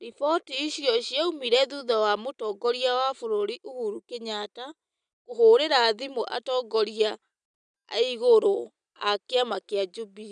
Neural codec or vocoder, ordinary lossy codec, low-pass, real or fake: none; none; 9.9 kHz; real